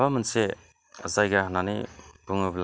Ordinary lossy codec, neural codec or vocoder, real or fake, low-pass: none; none; real; none